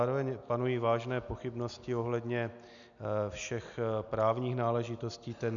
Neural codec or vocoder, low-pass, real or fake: none; 7.2 kHz; real